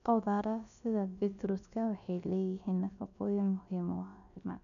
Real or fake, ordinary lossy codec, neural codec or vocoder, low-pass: fake; none; codec, 16 kHz, about 1 kbps, DyCAST, with the encoder's durations; 7.2 kHz